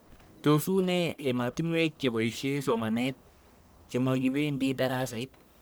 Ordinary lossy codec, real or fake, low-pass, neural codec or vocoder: none; fake; none; codec, 44.1 kHz, 1.7 kbps, Pupu-Codec